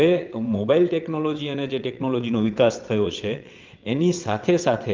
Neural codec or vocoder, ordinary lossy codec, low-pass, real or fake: vocoder, 22.05 kHz, 80 mel bands, WaveNeXt; Opus, 32 kbps; 7.2 kHz; fake